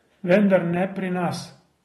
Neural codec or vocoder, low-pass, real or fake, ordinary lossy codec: none; 19.8 kHz; real; AAC, 32 kbps